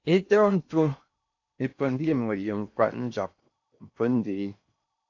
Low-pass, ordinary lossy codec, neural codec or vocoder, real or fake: 7.2 kHz; AAC, 48 kbps; codec, 16 kHz in and 24 kHz out, 0.6 kbps, FocalCodec, streaming, 4096 codes; fake